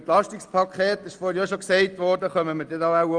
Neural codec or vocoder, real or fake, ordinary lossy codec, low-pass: none; real; Opus, 32 kbps; 9.9 kHz